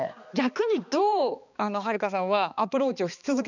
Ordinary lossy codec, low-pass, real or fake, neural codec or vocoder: none; 7.2 kHz; fake; codec, 16 kHz, 2 kbps, X-Codec, HuBERT features, trained on balanced general audio